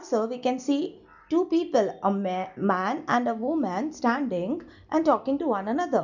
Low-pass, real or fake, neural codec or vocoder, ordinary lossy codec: 7.2 kHz; real; none; none